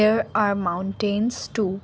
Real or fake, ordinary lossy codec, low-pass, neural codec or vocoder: real; none; none; none